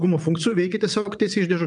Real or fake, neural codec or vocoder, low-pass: fake; vocoder, 22.05 kHz, 80 mel bands, WaveNeXt; 9.9 kHz